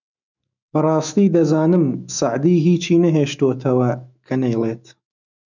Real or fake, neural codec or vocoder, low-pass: fake; autoencoder, 48 kHz, 128 numbers a frame, DAC-VAE, trained on Japanese speech; 7.2 kHz